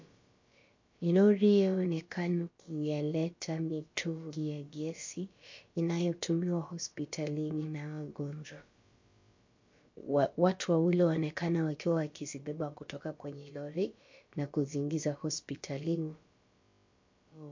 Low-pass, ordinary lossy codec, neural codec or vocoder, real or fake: 7.2 kHz; MP3, 48 kbps; codec, 16 kHz, about 1 kbps, DyCAST, with the encoder's durations; fake